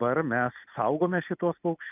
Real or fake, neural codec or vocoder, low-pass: real; none; 3.6 kHz